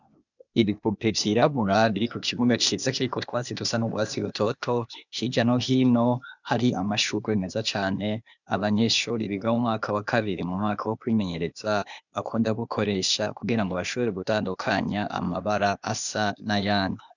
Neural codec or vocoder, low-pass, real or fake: codec, 16 kHz, 0.8 kbps, ZipCodec; 7.2 kHz; fake